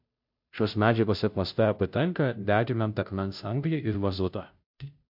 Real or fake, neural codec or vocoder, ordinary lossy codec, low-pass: fake; codec, 16 kHz, 0.5 kbps, FunCodec, trained on Chinese and English, 25 frames a second; MP3, 48 kbps; 5.4 kHz